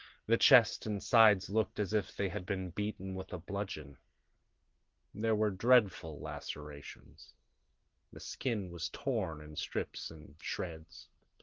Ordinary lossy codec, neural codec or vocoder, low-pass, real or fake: Opus, 16 kbps; none; 7.2 kHz; real